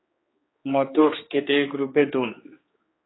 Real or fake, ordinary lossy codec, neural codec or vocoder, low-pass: fake; AAC, 16 kbps; codec, 16 kHz, 2 kbps, X-Codec, HuBERT features, trained on general audio; 7.2 kHz